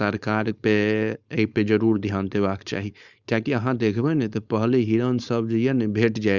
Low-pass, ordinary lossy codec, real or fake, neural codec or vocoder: 7.2 kHz; Opus, 64 kbps; fake; codec, 16 kHz, 4.8 kbps, FACodec